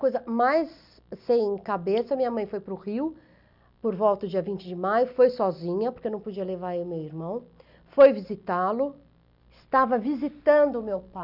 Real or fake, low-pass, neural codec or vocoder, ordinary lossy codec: real; 5.4 kHz; none; none